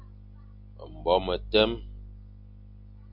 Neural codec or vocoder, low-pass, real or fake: none; 5.4 kHz; real